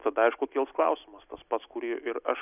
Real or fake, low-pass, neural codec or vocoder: real; 3.6 kHz; none